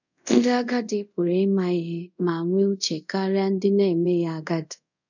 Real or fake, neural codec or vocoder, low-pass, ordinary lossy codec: fake; codec, 24 kHz, 0.5 kbps, DualCodec; 7.2 kHz; none